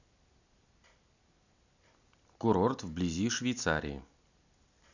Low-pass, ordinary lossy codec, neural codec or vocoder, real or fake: 7.2 kHz; none; none; real